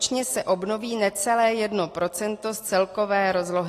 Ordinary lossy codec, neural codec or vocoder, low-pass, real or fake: AAC, 48 kbps; none; 14.4 kHz; real